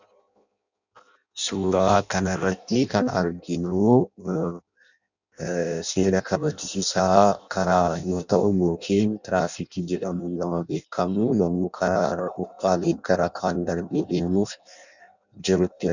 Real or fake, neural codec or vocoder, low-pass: fake; codec, 16 kHz in and 24 kHz out, 0.6 kbps, FireRedTTS-2 codec; 7.2 kHz